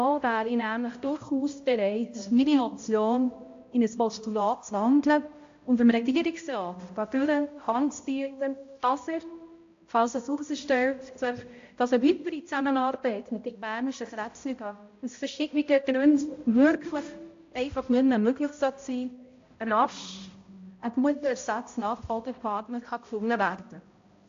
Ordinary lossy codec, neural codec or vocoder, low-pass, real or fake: AAC, 48 kbps; codec, 16 kHz, 0.5 kbps, X-Codec, HuBERT features, trained on balanced general audio; 7.2 kHz; fake